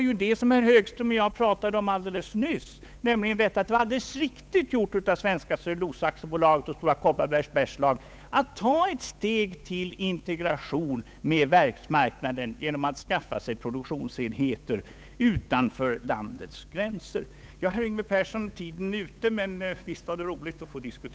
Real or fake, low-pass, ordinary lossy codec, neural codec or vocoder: fake; none; none; codec, 16 kHz, 8 kbps, FunCodec, trained on Chinese and English, 25 frames a second